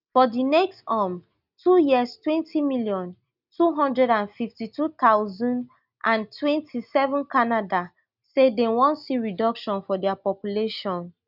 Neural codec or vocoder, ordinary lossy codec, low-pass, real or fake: none; none; 5.4 kHz; real